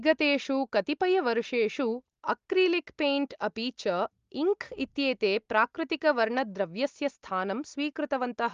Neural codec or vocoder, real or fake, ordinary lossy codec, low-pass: none; real; Opus, 32 kbps; 7.2 kHz